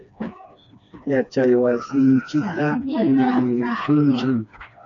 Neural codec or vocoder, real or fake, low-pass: codec, 16 kHz, 2 kbps, FreqCodec, smaller model; fake; 7.2 kHz